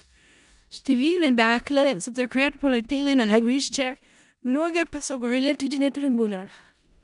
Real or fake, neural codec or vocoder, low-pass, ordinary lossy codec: fake; codec, 16 kHz in and 24 kHz out, 0.4 kbps, LongCat-Audio-Codec, four codebook decoder; 10.8 kHz; none